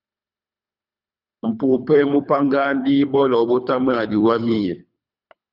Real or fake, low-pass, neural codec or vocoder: fake; 5.4 kHz; codec, 24 kHz, 3 kbps, HILCodec